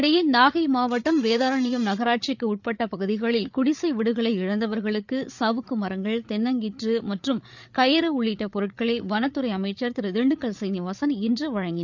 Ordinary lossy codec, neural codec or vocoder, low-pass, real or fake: none; codec, 16 kHz, 8 kbps, FreqCodec, larger model; 7.2 kHz; fake